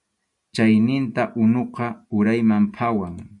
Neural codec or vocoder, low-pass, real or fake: none; 10.8 kHz; real